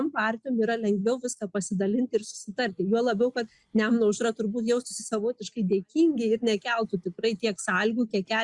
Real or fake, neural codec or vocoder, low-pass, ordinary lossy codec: real; none; 10.8 kHz; Opus, 64 kbps